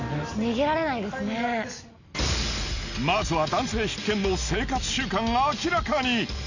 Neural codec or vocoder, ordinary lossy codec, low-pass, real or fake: none; none; 7.2 kHz; real